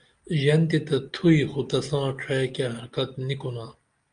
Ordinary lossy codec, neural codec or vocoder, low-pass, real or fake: Opus, 32 kbps; none; 9.9 kHz; real